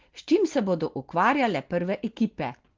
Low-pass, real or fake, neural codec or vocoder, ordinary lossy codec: 7.2 kHz; real; none; Opus, 16 kbps